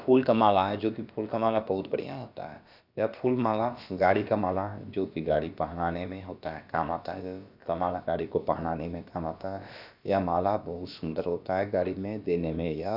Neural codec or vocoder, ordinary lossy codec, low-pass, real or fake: codec, 16 kHz, about 1 kbps, DyCAST, with the encoder's durations; none; 5.4 kHz; fake